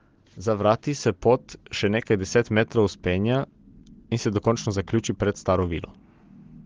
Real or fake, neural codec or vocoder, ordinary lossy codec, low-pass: real; none; Opus, 16 kbps; 7.2 kHz